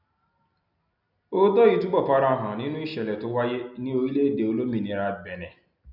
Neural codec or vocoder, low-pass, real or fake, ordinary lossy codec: none; 5.4 kHz; real; none